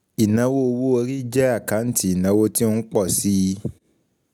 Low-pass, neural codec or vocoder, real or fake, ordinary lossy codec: none; none; real; none